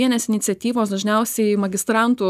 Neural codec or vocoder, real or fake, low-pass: none; real; 14.4 kHz